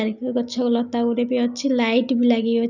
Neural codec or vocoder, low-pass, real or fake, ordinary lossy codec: none; 7.2 kHz; real; none